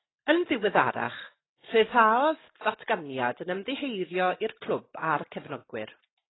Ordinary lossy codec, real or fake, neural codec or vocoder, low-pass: AAC, 16 kbps; real; none; 7.2 kHz